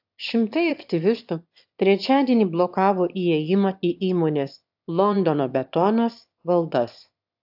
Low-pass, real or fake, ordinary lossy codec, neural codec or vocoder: 5.4 kHz; fake; AAC, 48 kbps; autoencoder, 22.05 kHz, a latent of 192 numbers a frame, VITS, trained on one speaker